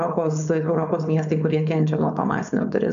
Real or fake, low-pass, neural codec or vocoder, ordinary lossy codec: fake; 7.2 kHz; codec, 16 kHz, 4.8 kbps, FACodec; MP3, 64 kbps